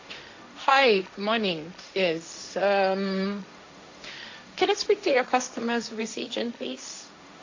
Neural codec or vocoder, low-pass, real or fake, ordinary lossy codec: codec, 16 kHz, 1.1 kbps, Voila-Tokenizer; 7.2 kHz; fake; AAC, 48 kbps